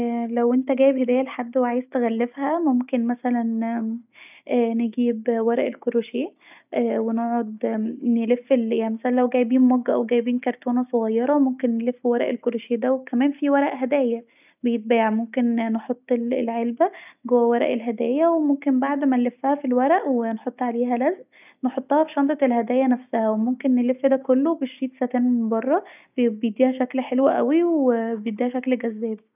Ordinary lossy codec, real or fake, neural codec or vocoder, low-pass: none; real; none; 3.6 kHz